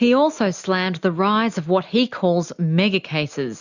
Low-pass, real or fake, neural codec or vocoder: 7.2 kHz; real; none